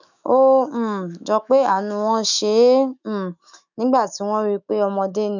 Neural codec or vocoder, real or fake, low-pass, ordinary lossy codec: autoencoder, 48 kHz, 128 numbers a frame, DAC-VAE, trained on Japanese speech; fake; 7.2 kHz; none